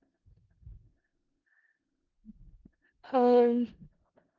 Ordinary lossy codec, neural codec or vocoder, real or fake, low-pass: Opus, 16 kbps; codec, 16 kHz in and 24 kHz out, 0.4 kbps, LongCat-Audio-Codec, four codebook decoder; fake; 7.2 kHz